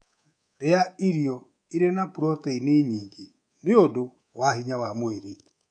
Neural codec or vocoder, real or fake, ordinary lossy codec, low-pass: codec, 24 kHz, 3.1 kbps, DualCodec; fake; none; 9.9 kHz